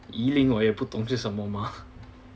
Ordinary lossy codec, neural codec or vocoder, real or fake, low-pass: none; none; real; none